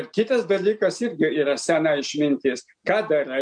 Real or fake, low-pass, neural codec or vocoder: real; 9.9 kHz; none